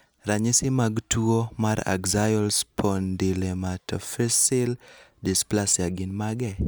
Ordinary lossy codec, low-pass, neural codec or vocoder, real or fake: none; none; none; real